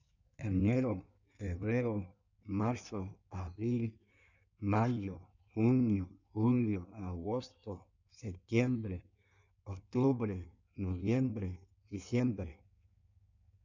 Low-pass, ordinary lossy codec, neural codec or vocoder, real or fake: 7.2 kHz; none; codec, 16 kHz in and 24 kHz out, 1.1 kbps, FireRedTTS-2 codec; fake